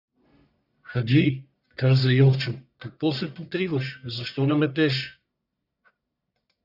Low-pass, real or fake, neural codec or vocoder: 5.4 kHz; fake; codec, 44.1 kHz, 1.7 kbps, Pupu-Codec